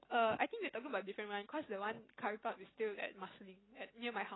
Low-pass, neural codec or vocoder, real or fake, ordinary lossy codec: 7.2 kHz; none; real; AAC, 16 kbps